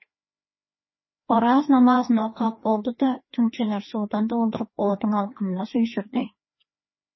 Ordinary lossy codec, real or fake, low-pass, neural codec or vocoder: MP3, 24 kbps; fake; 7.2 kHz; codec, 16 kHz, 2 kbps, FreqCodec, larger model